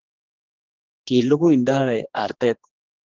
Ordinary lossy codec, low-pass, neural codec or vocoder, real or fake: Opus, 16 kbps; 7.2 kHz; codec, 16 kHz, 2 kbps, X-Codec, HuBERT features, trained on general audio; fake